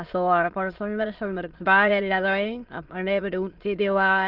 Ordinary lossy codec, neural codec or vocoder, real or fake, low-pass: Opus, 16 kbps; autoencoder, 22.05 kHz, a latent of 192 numbers a frame, VITS, trained on many speakers; fake; 5.4 kHz